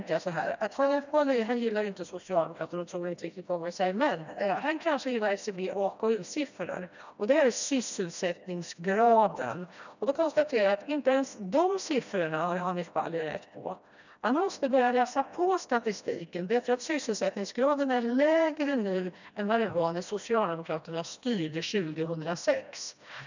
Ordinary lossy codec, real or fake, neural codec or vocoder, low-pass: none; fake; codec, 16 kHz, 1 kbps, FreqCodec, smaller model; 7.2 kHz